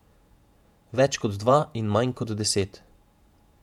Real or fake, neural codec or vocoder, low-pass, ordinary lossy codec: fake; vocoder, 48 kHz, 128 mel bands, Vocos; 19.8 kHz; MP3, 96 kbps